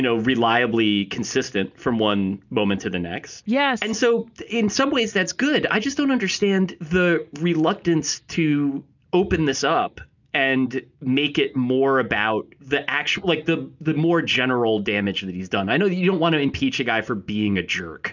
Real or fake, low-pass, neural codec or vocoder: real; 7.2 kHz; none